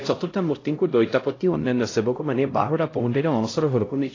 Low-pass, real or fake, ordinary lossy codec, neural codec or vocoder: 7.2 kHz; fake; AAC, 32 kbps; codec, 16 kHz, 0.5 kbps, X-Codec, HuBERT features, trained on LibriSpeech